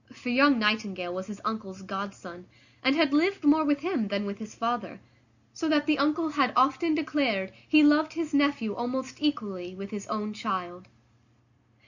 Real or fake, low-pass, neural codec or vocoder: real; 7.2 kHz; none